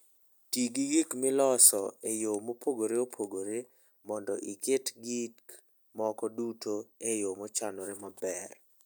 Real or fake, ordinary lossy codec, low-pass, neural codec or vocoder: real; none; none; none